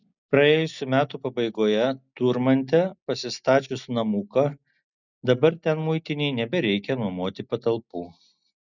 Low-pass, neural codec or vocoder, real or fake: 7.2 kHz; none; real